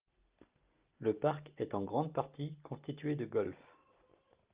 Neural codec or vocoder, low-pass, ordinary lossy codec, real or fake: none; 3.6 kHz; Opus, 32 kbps; real